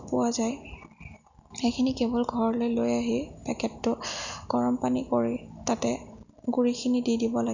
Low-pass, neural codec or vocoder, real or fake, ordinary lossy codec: 7.2 kHz; none; real; none